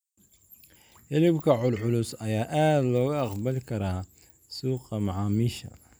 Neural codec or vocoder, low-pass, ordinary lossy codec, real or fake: none; none; none; real